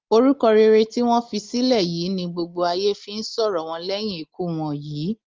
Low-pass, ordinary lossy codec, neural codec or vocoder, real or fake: 7.2 kHz; Opus, 32 kbps; none; real